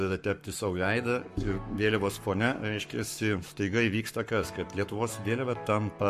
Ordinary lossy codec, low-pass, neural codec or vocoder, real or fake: MP3, 64 kbps; 14.4 kHz; codec, 44.1 kHz, 7.8 kbps, Pupu-Codec; fake